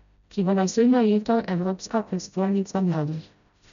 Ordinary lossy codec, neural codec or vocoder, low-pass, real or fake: none; codec, 16 kHz, 0.5 kbps, FreqCodec, smaller model; 7.2 kHz; fake